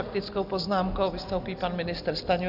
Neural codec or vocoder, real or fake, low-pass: none; real; 5.4 kHz